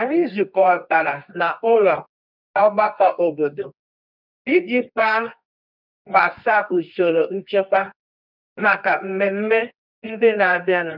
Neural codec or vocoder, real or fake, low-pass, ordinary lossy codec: codec, 24 kHz, 0.9 kbps, WavTokenizer, medium music audio release; fake; 5.4 kHz; none